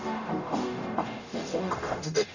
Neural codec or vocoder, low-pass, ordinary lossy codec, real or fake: codec, 44.1 kHz, 0.9 kbps, DAC; 7.2 kHz; none; fake